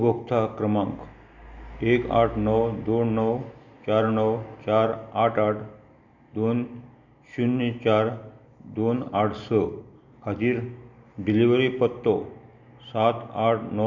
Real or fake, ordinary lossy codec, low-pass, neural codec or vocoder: real; none; 7.2 kHz; none